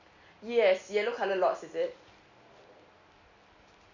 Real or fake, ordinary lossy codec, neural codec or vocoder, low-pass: real; none; none; 7.2 kHz